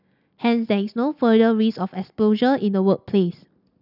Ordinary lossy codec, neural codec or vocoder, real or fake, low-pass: none; none; real; 5.4 kHz